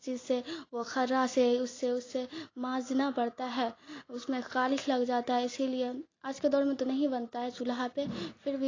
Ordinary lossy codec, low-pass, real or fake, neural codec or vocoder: AAC, 32 kbps; 7.2 kHz; real; none